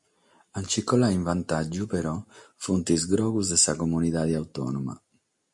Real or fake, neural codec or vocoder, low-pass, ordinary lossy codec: real; none; 10.8 kHz; MP3, 48 kbps